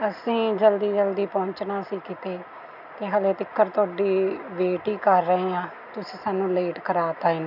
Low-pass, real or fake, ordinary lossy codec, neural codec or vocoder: 5.4 kHz; real; none; none